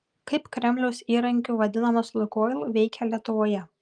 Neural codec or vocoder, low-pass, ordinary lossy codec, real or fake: none; 9.9 kHz; Opus, 32 kbps; real